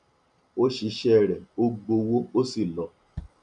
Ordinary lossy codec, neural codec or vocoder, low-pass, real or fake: MP3, 96 kbps; none; 9.9 kHz; real